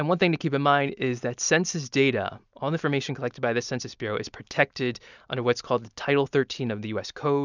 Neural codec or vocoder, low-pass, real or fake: none; 7.2 kHz; real